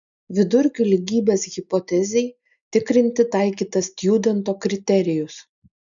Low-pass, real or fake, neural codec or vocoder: 7.2 kHz; real; none